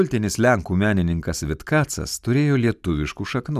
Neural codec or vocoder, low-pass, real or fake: none; 14.4 kHz; real